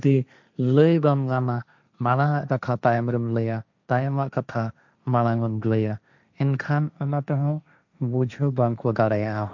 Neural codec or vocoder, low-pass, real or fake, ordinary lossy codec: codec, 16 kHz, 1.1 kbps, Voila-Tokenizer; 7.2 kHz; fake; none